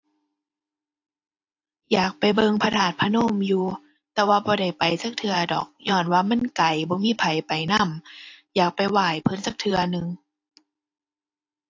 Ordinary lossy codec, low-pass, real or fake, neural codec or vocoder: AAC, 48 kbps; 7.2 kHz; fake; vocoder, 44.1 kHz, 128 mel bands every 512 samples, BigVGAN v2